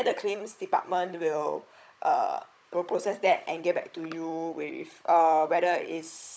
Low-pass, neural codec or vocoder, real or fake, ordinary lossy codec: none; codec, 16 kHz, 16 kbps, FunCodec, trained on Chinese and English, 50 frames a second; fake; none